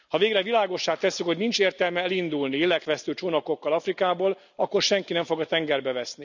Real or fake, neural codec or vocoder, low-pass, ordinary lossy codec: real; none; 7.2 kHz; none